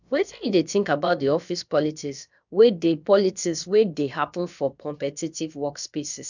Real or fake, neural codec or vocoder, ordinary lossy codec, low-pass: fake; codec, 16 kHz, about 1 kbps, DyCAST, with the encoder's durations; none; 7.2 kHz